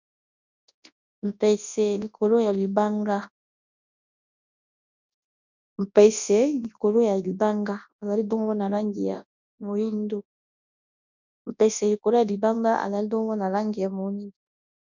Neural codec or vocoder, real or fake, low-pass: codec, 24 kHz, 0.9 kbps, WavTokenizer, large speech release; fake; 7.2 kHz